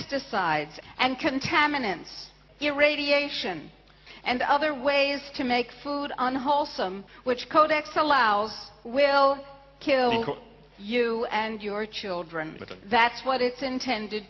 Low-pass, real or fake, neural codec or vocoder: 7.2 kHz; real; none